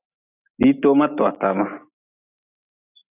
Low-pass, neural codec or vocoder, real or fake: 3.6 kHz; none; real